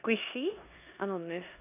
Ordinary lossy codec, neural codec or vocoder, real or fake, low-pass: none; codec, 16 kHz in and 24 kHz out, 0.9 kbps, LongCat-Audio-Codec, four codebook decoder; fake; 3.6 kHz